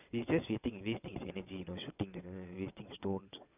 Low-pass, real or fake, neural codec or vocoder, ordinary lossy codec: 3.6 kHz; fake; vocoder, 22.05 kHz, 80 mel bands, WaveNeXt; none